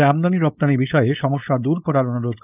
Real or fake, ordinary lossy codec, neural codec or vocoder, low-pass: fake; none; codec, 16 kHz, 4.8 kbps, FACodec; 3.6 kHz